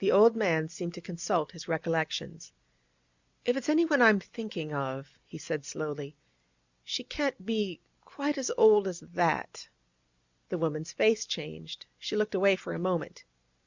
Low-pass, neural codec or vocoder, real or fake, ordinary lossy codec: 7.2 kHz; none; real; Opus, 64 kbps